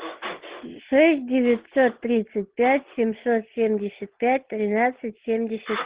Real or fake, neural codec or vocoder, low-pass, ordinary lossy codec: real; none; 3.6 kHz; Opus, 16 kbps